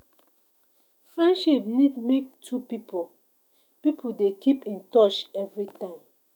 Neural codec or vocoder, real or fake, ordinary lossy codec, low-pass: autoencoder, 48 kHz, 128 numbers a frame, DAC-VAE, trained on Japanese speech; fake; none; 19.8 kHz